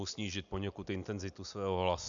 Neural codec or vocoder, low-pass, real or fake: none; 7.2 kHz; real